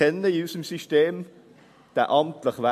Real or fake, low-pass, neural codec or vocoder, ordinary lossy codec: real; 14.4 kHz; none; MP3, 64 kbps